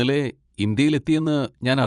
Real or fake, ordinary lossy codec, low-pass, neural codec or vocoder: fake; MP3, 96 kbps; 10.8 kHz; vocoder, 24 kHz, 100 mel bands, Vocos